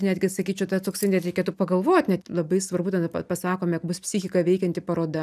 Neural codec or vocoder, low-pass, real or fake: none; 14.4 kHz; real